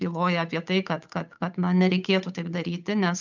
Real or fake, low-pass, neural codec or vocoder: fake; 7.2 kHz; codec, 16 kHz, 8 kbps, FunCodec, trained on Chinese and English, 25 frames a second